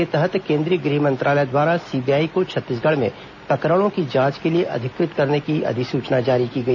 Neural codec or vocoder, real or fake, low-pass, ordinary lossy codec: none; real; 7.2 kHz; none